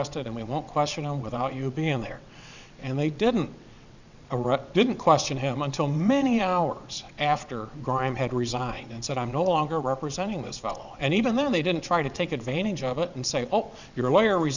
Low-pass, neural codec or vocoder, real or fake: 7.2 kHz; vocoder, 22.05 kHz, 80 mel bands, WaveNeXt; fake